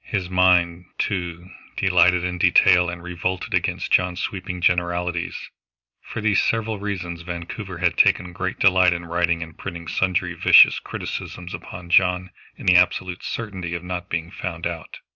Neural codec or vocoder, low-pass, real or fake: none; 7.2 kHz; real